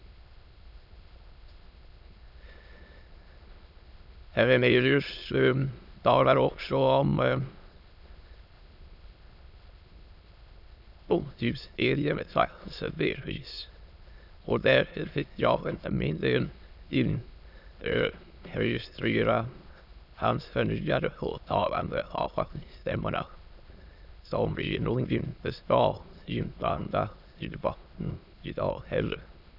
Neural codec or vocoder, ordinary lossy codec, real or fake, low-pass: autoencoder, 22.05 kHz, a latent of 192 numbers a frame, VITS, trained on many speakers; none; fake; 5.4 kHz